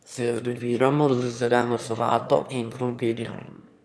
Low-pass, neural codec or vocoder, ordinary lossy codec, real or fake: none; autoencoder, 22.05 kHz, a latent of 192 numbers a frame, VITS, trained on one speaker; none; fake